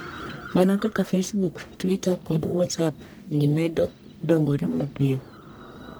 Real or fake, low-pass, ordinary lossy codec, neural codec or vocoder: fake; none; none; codec, 44.1 kHz, 1.7 kbps, Pupu-Codec